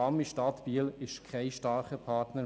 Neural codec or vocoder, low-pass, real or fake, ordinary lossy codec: none; none; real; none